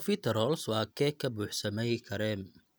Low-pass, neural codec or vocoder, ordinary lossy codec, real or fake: none; none; none; real